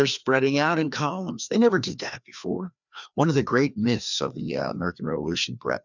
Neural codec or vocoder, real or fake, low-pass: codec, 16 kHz, 2 kbps, FreqCodec, larger model; fake; 7.2 kHz